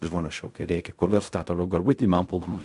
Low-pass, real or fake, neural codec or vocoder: 10.8 kHz; fake; codec, 16 kHz in and 24 kHz out, 0.4 kbps, LongCat-Audio-Codec, fine tuned four codebook decoder